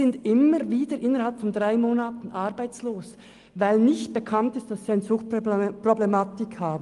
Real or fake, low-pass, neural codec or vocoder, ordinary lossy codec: real; 10.8 kHz; none; Opus, 32 kbps